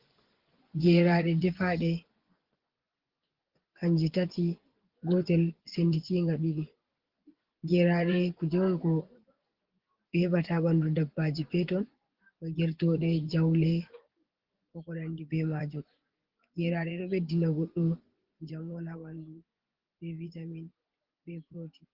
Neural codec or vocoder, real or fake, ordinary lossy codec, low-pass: vocoder, 24 kHz, 100 mel bands, Vocos; fake; Opus, 16 kbps; 5.4 kHz